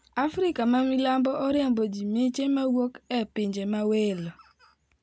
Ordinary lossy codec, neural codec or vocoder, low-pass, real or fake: none; none; none; real